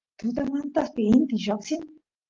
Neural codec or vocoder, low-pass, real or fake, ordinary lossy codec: none; 7.2 kHz; real; Opus, 32 kbps